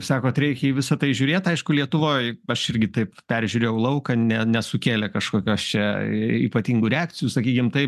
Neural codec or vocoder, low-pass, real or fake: none; 14.4 kHz; real